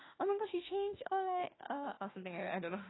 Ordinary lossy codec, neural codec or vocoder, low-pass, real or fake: AAC, 16 kbps; autoencoder, 48 kHz, 32 numbers a frame, DAC-VAE, trained on Japanese speech; 7.2 kHz; fake